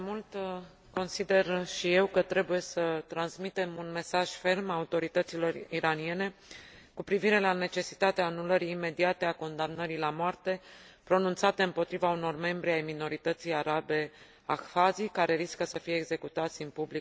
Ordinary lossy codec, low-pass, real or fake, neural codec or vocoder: none; none; real; none